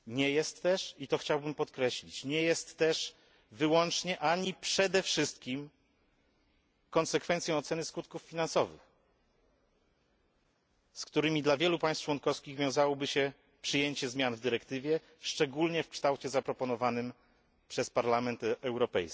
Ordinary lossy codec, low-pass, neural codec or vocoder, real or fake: none; none; none; real